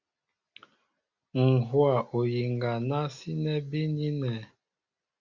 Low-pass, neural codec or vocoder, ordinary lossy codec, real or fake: 7.2 kHz; none; Opus, 64 kbps; real